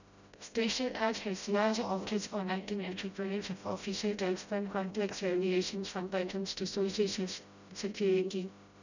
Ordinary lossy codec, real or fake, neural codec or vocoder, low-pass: none; fake; codec, 16 kHz, 0.5 kbps, FreqCodec, smaller model; 7.2 kHz